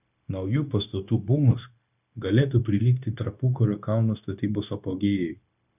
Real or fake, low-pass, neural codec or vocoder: fake; 3.6 kHz; codec, 16 kHz, 0.9 kbps, LongCat-Audio-Codec